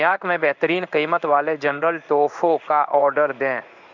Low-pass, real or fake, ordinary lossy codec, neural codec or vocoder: 7.2 kHz; fake; none; codec, 16 kHz in and 24 kHz out, 1 kbps, XY-Tokenizer